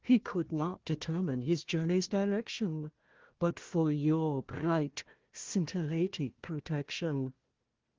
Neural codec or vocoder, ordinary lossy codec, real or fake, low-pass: codec, 16 kHz, 1 kbps, FunCodec, trained on Chinese and English, 50 frames a second; Opus, 16 kbps; fake; 7.2 kHz